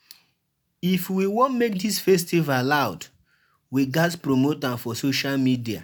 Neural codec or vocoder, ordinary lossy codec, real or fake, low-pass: none; none; real; none